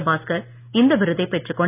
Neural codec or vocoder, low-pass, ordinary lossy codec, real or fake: none; 3.6 kHz; none; real